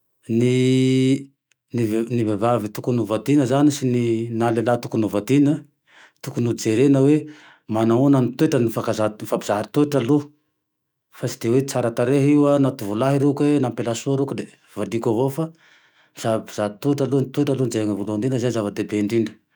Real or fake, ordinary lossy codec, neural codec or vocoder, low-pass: fake; none; autoencoder, 48 kHz, 128 numbers a frame, DAC-VAE, trained on Japanese speech; none